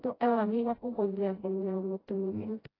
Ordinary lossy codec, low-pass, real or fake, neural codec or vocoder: AAC, 24 kbps; 5.4 kHz; fake; codec, 16 kHz, 0.5 kbps, FreqCodec, smaller model